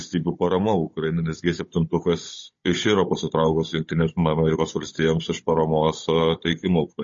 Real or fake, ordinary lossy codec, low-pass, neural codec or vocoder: fake; MP3, 32 kbps; 7.2 kHz; codec, 16 kHz, 16 kbps, FunCodec, trained on Chinese and English, 50 frames a second